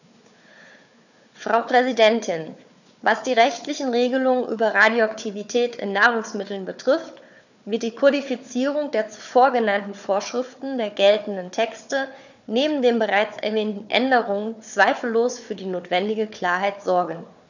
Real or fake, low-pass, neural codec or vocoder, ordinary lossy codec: fake; 7.2 kHz; codec, 16 kHz, 4 kbps, FunCodec, trained on Chinese and English, 50 frames a second; none